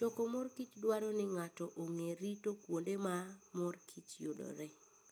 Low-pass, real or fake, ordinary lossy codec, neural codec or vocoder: none; real; none; none